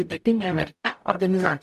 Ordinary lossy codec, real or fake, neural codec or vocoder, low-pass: MP3, 96 kbps; fake; codec, 44.1 kHz, 0.9 kbps, DAC; 14.4 kHz